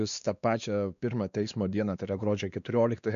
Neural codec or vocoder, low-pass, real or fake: codec, 16 kHz, 2 kbps, X-Codec, WavLM features, trained on Multilingual LibriSpeech; 7.2 kHz; fake